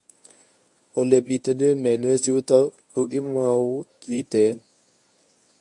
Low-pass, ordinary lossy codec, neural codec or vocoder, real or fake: 10.8 kHz; MP3, 64 kbps; codec, 24 kHz, 0.9 kbps, WavTokenizer, medium speech release version 1; fake